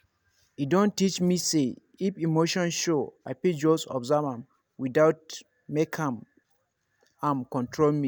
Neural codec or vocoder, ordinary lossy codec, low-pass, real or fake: none; none; none; real